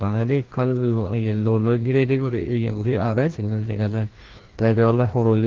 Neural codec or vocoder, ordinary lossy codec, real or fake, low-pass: codec, 16 kHz, 1 kbps, FreqCodec, larger model; Opus, 16 kbps; fake; 7.2 kHz